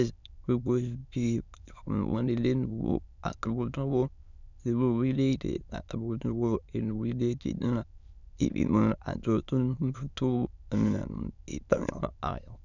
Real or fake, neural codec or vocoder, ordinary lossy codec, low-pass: fake; autoencoder, 22.05 kHz, a latent of 192 numbers a frame, VITS, trained on many speakers; none; 7.2 kHz